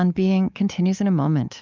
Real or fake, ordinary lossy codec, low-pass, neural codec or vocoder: fake; Opus, 24 kbps; 7.2 kHz; codec, 24 kHz, 3.1 kbps, DualCodec